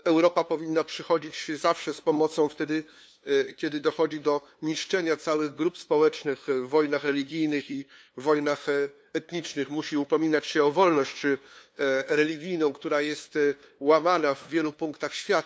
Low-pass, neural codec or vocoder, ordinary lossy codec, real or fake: none; codec, 16 kHz, 2 kbps, FunCodec, trained on LibriTTS, 25 frames a second; none; fake